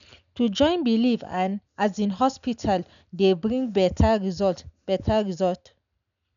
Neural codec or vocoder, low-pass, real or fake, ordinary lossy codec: none; 7.2 kHz; real; none